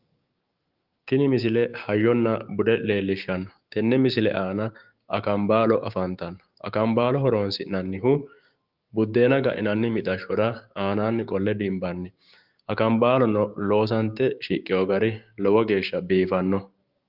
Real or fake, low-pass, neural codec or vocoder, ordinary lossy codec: fake; 5.4 kHz; codec, 16 kHz, 6 kbps, DAC; Opus, 32 kbps